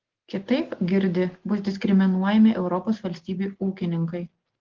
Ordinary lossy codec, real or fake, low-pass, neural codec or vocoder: Opus, 16 kbps; real; 7.2 kHz; none